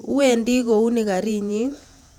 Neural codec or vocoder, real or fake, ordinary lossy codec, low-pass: vocoder, 48 kHz, 128 mel bands, Vocos; fake; none; 19.8 kHz